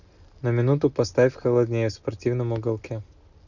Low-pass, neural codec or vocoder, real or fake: 7.2 kHz; none; real